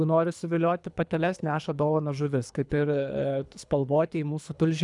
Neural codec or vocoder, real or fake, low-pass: codec, 24 kHz, 3 kbps, HILCodec; fake; 10.8 kHz